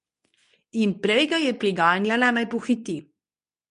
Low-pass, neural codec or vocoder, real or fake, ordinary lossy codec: 10.8 kHz; codec, 24 kHz, 0.9 kbps, WavTokenizer, medium speech release version 2; fake; MP3, 64 kbps